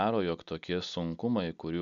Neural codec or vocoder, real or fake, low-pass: none; real; 7.2 kHz